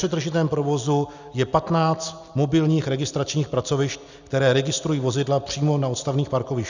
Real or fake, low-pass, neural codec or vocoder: real; 7.2 kHz; none